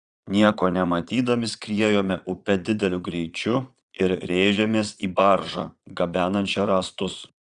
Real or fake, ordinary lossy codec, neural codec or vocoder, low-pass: fake; Opus, 64 kbps; vocoder, 22.05 kHz, 80 mel bands, Vocos; 9.9 kHz